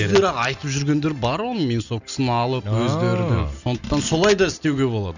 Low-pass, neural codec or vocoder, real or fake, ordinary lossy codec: 7.2 kHz; none; real; none